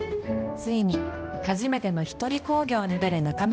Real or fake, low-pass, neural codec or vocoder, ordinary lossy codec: fake; none; codec, 16 kHz, 1 kbps, X-Codec, HuBERT features, trained on balanced general audio; none